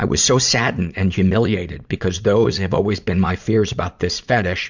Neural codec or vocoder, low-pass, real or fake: vocoder, 44.1 kHz, 80 mel bands, Vocos; 7.2 kHz; fake